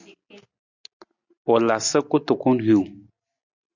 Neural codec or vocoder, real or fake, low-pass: none; real; 7.2 kHz